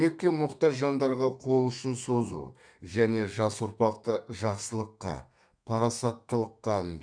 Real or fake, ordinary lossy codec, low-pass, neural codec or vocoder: fake; none; 9.9 kHz; codec, 32 kHz, 1.9 kbps, SNAC